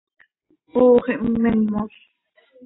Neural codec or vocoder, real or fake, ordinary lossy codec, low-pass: none; real; AAC, 16 kbps; 7.2 kHz